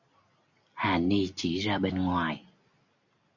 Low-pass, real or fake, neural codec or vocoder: 7.2 kHz; real; none